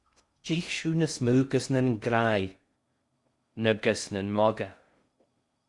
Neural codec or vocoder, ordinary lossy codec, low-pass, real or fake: codec, 16 kHz in and 24 kHz out, 0.6 kbps, FocalCodec, streaming, 2048 codes; Opus, 64 kbps; 10.8 kHz; fake